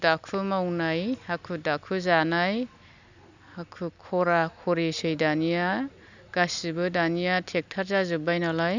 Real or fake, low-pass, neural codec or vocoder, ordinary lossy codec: real; 7.2 kHz; none; none